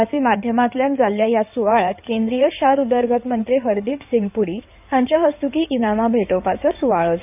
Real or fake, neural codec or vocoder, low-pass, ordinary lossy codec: fake; codec, 16 kHz in and 24 kHz out, 2.2 kbps, FireRedTTS-2 codec; 3.6 kHz; none